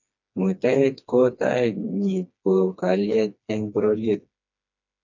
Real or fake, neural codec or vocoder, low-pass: fake; codec, 16 kHz, 2 kbps, FreqCodec, smaller model; 7.2 kHz